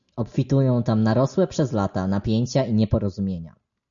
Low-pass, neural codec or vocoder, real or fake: 7.2 kHz; none; real